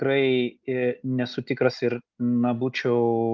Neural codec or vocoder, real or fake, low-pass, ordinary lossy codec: none; real; 7.2 kHz; Opus, 24 kbps